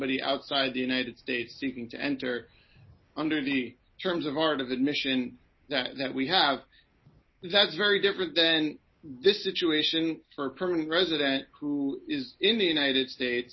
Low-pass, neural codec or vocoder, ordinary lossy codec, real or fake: 7.2 kHz; none; MP3, 24 kbps; real